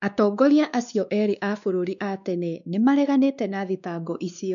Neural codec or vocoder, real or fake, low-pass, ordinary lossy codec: codec, 16 kHz, 2 kbps, X-Codec, WavLM features, trained on Multilingual LibriSpeech; fake; 7.2 kHz; none